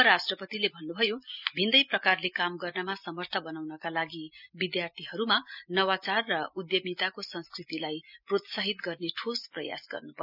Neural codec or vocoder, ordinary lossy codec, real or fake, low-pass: none; none; real; 5.4 kHz